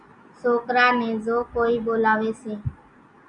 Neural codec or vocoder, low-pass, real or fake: none; 9.9 kHz; real